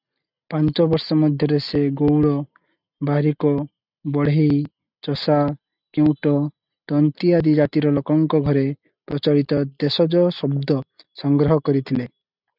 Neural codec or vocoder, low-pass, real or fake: none; 5.4 kHz; real